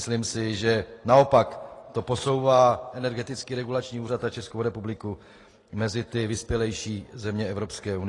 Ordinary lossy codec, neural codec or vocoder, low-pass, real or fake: AAC, 32 kbps; none; 10.8 kHz; real